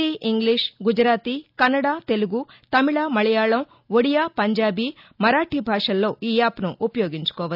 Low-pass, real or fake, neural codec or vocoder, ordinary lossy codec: 5.4 kHz; real; none; none